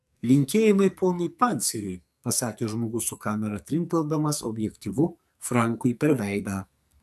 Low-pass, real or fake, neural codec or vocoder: 14.4 kHz; fake; codec, 44.1 kHz, 2.6 kbps, SNAC